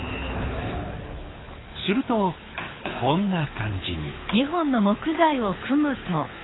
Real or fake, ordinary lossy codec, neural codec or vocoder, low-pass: fake; AAC, 16 kbps; codec, 24 kHz, 6 kbps, HILCodec; 7.2 kHz